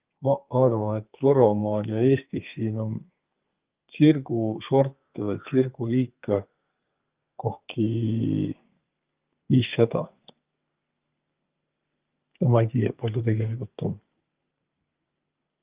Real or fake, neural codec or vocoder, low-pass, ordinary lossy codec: fake; codec, 32 kHz, 1.9 kbps, SNAC; 3.6 kHz; Opus, 32 kbps